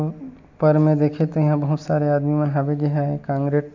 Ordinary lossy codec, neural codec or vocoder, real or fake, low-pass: MP3, 64 kbps; none; real; 7.2 kHz